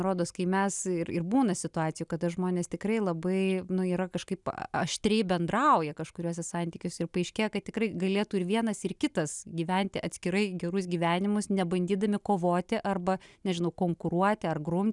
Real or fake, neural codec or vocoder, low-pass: real; none; 10.8 kHz